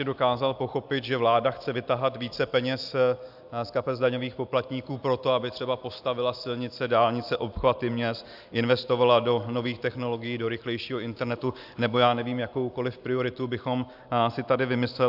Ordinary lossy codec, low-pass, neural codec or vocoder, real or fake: AAC, 48 kbps; 5.4 kHz; none; real